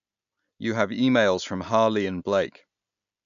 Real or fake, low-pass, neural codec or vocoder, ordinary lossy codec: real; 7.2 kHz; none; none